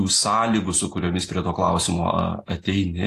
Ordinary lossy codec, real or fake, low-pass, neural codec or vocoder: AAC, 48 kbps; real; 14.4 kHz; none